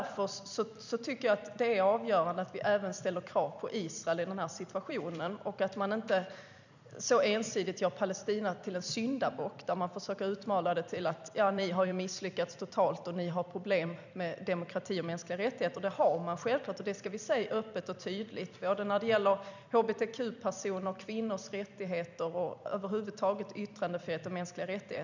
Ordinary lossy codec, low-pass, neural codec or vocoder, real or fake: none; 7.2 kHz; vocoder, 44.1 kHz, 128 mel bands every 256 samples, BigVGAN v2; fake